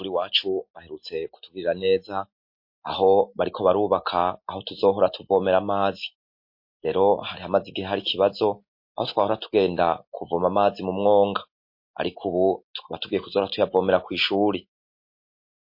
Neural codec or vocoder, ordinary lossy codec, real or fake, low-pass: none; MP3, 32 kbps; real; 5.4 kHz